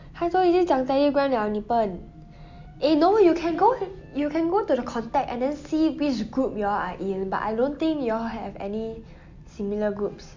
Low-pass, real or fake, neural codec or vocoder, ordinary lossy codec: 7.2 kHz; real; none; MP3, 48 kbps